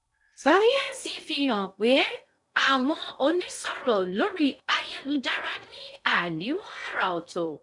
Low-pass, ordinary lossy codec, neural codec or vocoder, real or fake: 10.8 kHz; none; codec, 16 kHz in and 24 kHz out, 0.6 kbps, FocalCodec, streaming, 2048 codes; fake